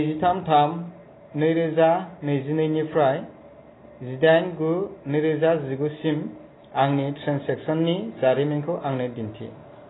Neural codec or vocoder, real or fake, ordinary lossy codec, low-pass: none; real; AAC, 16 kbps; 7.2 kHz